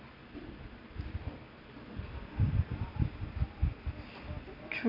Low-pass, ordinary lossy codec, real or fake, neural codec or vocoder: 5.4 kHz; none; real; none